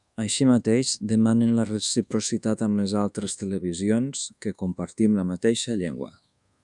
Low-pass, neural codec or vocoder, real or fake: 10.8 kHz; codec, 24 kHz, 1.2 kbps, DualCodec; fake